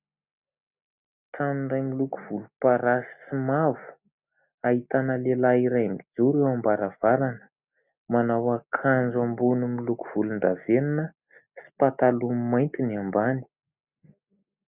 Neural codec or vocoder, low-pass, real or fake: none; 3.6 kHz; real